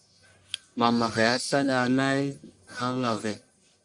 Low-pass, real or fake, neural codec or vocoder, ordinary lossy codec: 10.8 kHz; fake; codec, 44.1 kHz, 1.7 kbps, Pupu-Codec; MP3, 64 kbps